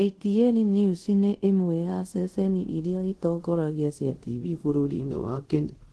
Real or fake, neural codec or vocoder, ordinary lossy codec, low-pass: fake; codec, 24 kHz, 0.5 kbps, DualCodec; Opus, 16 kbps; 10.8 kHz